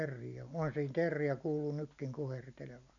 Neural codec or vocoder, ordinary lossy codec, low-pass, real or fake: none; none; 7.2 kHz; real